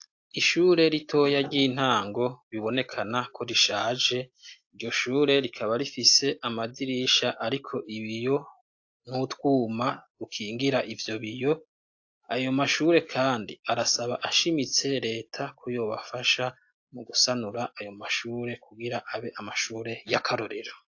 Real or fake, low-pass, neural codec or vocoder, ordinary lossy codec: real; 7.2 kHz; none; AAC, 48 kbps